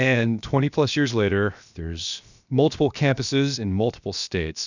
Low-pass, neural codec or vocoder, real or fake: 7.2 kHz; codec, 16 kHz, 0.7 kbps, FocalCodec; fake